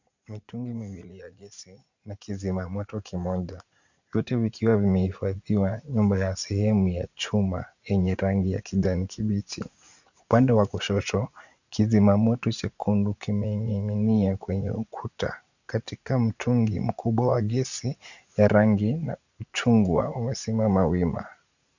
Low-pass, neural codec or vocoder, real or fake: 7.2 kHz; vocoder, 44.1 kHz, 80 mel bands, Vocos; fake